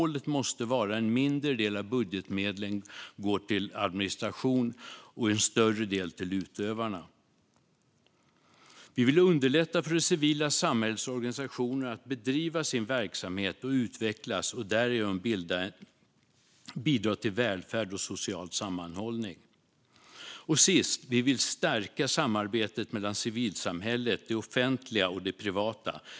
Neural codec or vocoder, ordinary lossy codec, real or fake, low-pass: none; none; real; none